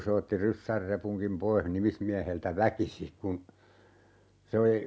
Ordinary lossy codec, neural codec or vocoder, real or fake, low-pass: none; none; real; none